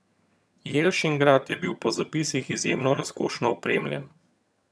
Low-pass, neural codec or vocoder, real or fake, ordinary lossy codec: none; vocoder, 22.05 kHz, 80 mel bands, HiFi-GAN; fake; none